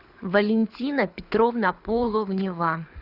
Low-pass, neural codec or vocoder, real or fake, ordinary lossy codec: 5.4 kHz; codec, 24 kHz, 6 kbps, HILCodec; fake; Opus, 64 kbps